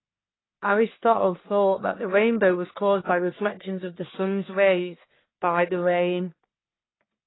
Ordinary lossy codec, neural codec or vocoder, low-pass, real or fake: AAC, 16 kbps; codec, 44.1 kHz, 1.7 kbps, Pupu-Codec; 7.2 kHz; fake